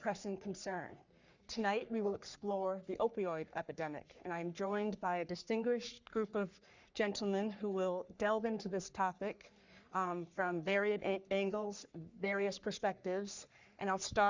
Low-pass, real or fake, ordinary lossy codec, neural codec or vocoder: 7.2 kHz; fake; Opus, 64 kbps; codec, 44.1 kHz, 3.4 kbps, Pupu-Codec